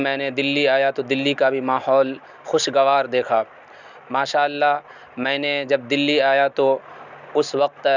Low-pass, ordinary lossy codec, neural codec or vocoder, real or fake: 7.2 kHz; none; none; real